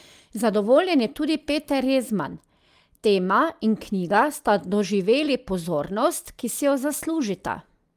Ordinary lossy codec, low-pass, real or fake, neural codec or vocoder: Opus, 32 kbps; 14.4 kHz; real; none